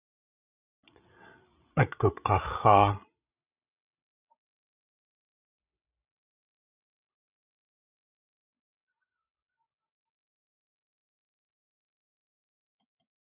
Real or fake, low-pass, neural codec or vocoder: fake; 3.6 kHz; codec, 16 kHz, 16 kbps, FreqCodec, larger model